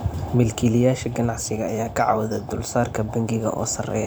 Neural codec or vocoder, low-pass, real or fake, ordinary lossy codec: vocoder, 44.1 kHz, 128 mel bands every 512 samples, BigVGAN v2; none; fake; none